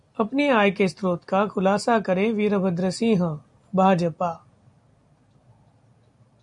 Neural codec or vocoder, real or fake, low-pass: none; real; 10.8 kHz